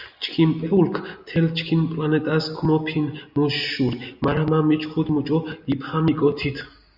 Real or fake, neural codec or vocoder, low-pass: real; none; 5.4 kHz